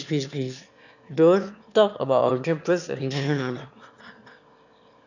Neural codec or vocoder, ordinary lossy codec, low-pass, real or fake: autoencoder, 22.05 kHz, a latent of 192 numbers a frame, VITS, trained on one speaker; none; 7.2 kHz; fake